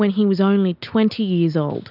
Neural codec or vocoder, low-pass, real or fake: none; 5.4 kHz; real